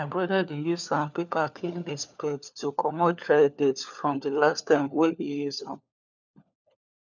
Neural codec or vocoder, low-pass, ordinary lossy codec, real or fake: codec, 16 kHz, 4 kbps, FunCodec, trained on LibriTTS, 50 frames a second; 7.2 kHz; none; fake